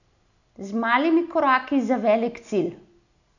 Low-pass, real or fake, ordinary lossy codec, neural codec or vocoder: 7.2 kHz; real; none; none